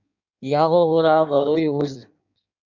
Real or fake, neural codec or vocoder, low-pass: fake; codec, 16 kHz in and 24 kHz out, 1.1 kbps, FireRedTTS-2 codec; 7.2 kHz